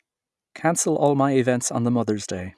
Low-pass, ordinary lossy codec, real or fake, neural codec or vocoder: none; none; real; none